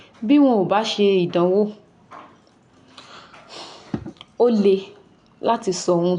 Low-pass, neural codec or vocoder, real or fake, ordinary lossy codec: 9.9 kHz; none; real; none